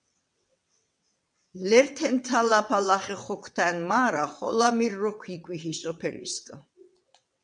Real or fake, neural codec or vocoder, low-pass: fake; vocoder, 22.05 kHz, 80 mel bands, WaveNeXt; 9.9 kHz